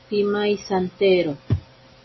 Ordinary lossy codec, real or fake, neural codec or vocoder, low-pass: MP3, 24 kbps; real; none; 7.2 kHz